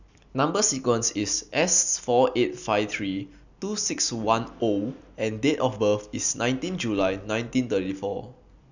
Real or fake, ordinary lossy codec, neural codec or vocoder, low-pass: real; none; none; 7.2 kHz